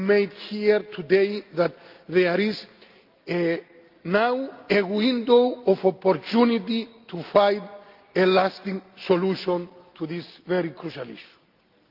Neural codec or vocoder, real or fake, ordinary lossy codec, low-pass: none; real; Opus, 24 kbps; 5.4 kHz